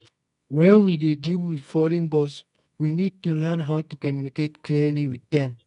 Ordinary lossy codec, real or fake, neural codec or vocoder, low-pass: none; fake; codec, 24 kHz, 0.9 kbps, WavTokenizer, medium music audio release; 10.8 kHz